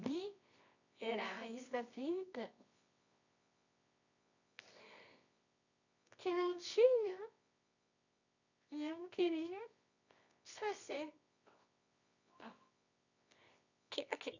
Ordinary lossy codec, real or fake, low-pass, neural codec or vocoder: none; fake; 7.2 kHz; codec, 24 kHz, 0.9 kbps, WavTokenizer, medium music audio release